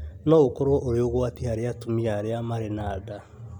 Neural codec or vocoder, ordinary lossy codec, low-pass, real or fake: none; none; 19.8 kHz; real